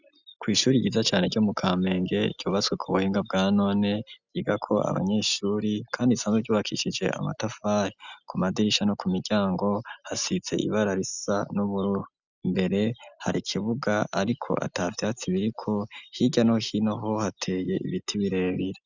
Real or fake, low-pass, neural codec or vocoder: real; 7.2 kHz; none